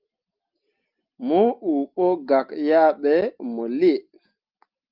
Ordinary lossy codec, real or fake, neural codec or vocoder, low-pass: Opus, 24 kbps; real; none; 5.4 kHz